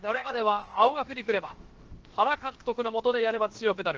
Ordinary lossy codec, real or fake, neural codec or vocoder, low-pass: Opus, 16 kbps; fake; codec, 16 kHz, about 1 kbps, DyCAST, with the encoder's durations; 7.2 kHz